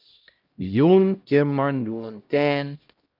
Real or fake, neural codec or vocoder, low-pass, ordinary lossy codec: fake; codec, 16 kHz, 0.5 kbps, X-Codec, HuBERT features, trained on LibriSpeech; 5.4 kHz; Opus, 24 kbps